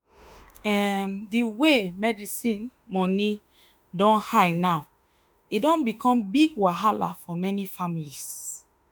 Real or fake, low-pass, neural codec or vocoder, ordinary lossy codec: fake; none; autoencoder, 48 kHz, 32 numbers a frame, DAC-VAE, trained on Japanese speech; none